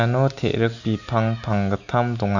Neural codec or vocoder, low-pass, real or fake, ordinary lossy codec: none; 7.2 kHz; real; none